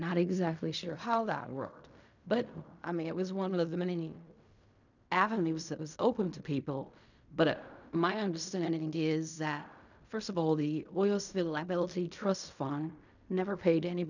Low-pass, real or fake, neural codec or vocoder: 7.2 kHz; fake; codec, 16 kHz in and 24 kHz out, 0.4 kbps, LongCat-Audio-Codec, fine tuned four codebook decoder